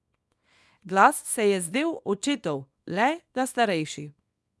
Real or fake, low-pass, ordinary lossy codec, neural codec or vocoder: fake; none; none; codec, 24 kHz, 0.9 kbps, WavTokenizer, small release